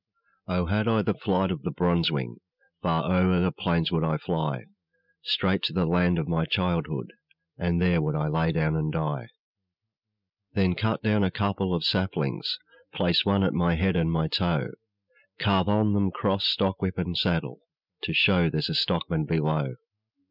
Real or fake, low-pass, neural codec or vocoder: real; 5.4 kHz; none